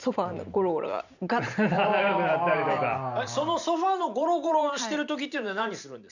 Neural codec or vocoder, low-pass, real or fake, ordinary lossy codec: vocoder, 44.1 kHz, 128 mel bands every 512 samples, BigVGAN v2; 7.2 kHz; fake; none